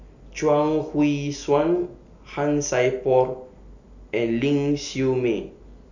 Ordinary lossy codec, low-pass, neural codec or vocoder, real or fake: none; 7.2 kHz; none; real